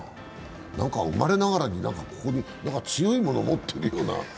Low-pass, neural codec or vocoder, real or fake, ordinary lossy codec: none; none; real; none